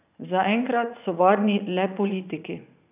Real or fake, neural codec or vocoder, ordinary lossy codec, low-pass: fake; vocoder, 44.1 kHz, 80 mel bands, Vocos; none; 3.6 kHz